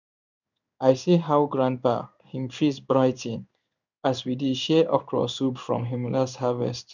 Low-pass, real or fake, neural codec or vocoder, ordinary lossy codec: 7.2 kHz; fake; codec, 16 kHz in and 24 kHz out, 1 kbps, XY-Tokenizer; none